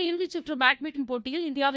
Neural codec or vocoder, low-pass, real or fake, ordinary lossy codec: codec, 16 kHz, 1 kbps, FunCodec, trained on LibriTTS, 50 frames a second; none; fake; none